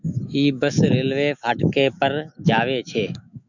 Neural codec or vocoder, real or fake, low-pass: autoencoder, 48 kHz, 128 numbers a frame, DAC-VAE, trained on Japanese speech; fake; 7.2 kHz